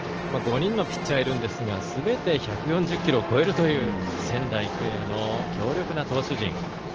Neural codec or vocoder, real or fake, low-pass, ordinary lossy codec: none; real; 7.2 kHz; Opus, 16 kbps